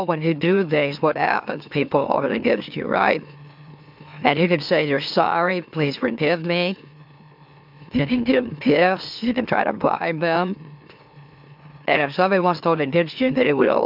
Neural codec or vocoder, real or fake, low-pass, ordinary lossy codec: autoencoder, 44.1 kHz, a latent of 192 numbers a frame, MeloTTS; fake; 5.4 kHz; MP3, 48 kbps